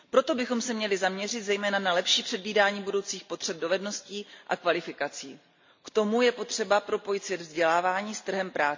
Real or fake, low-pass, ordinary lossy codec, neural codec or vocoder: real; 7.2 kHz; MP3, 48 kbps; none